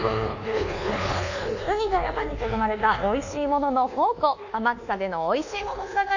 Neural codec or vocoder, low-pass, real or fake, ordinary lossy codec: codec, 24 kHz, 1.2 kbps, DualCodec; 7.2 kHz; fake; none